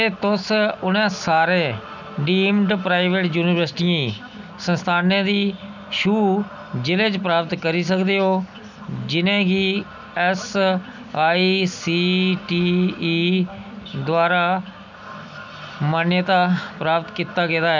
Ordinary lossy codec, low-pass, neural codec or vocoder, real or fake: none; 7.2 kHz; none; real